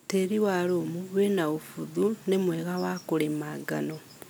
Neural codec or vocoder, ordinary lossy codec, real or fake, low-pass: none; none; real; none